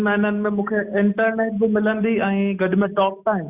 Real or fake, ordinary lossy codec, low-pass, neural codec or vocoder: real; Opus, 64 kbps; 3.6 kHz; none